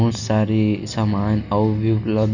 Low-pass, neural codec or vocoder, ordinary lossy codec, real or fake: 7.2 kHz; none; none; real